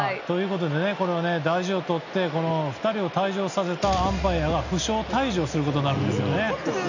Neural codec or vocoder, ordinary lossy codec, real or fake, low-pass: none; none; real; 7.2 kHz